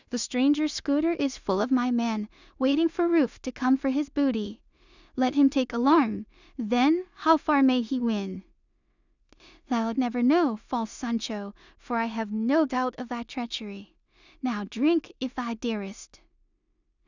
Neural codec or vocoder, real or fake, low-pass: codec, 16 kHz in and 24 kHz out, 0.4 kbps, LongCat-Audio-Codec, two codebook decoder; fake; 7.2 kHz